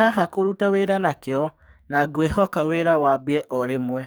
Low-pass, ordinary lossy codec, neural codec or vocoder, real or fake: none; none; codec, 44.1 kHz, 2.6 kbps, SNAC; fake